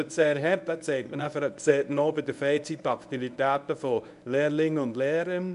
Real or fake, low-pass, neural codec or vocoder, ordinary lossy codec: fake; 10.8 kHz; codec, 24 kHz, 0.9 kbps, WavTokenizer, medium speech release version 1; none